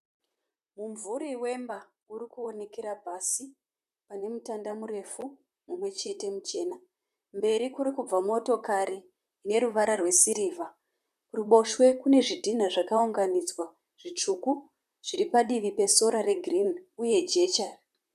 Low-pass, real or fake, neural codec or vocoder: 14.4 kHz; fake; vocoder, 44.1 kHz, 128 mel bands, Pupu-Vocoder